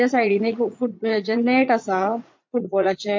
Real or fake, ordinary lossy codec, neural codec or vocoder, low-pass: fake; MP3, 48 kbps; vocoder, 44.1 kHz, 80 mel bands, Vocos; 7.2 kHz